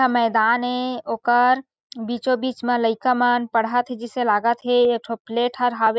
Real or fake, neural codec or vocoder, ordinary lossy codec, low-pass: real; none; none; none